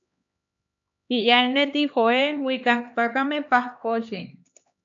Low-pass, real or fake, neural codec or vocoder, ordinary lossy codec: 7.2 kHz; fake; codec, 16 kHz, 2 kbps, X-Codec, HuBERT features, trained on LibriSpeech; AAC, 64 kbps